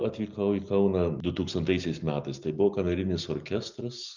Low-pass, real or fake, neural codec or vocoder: 7.2 kHz; real; none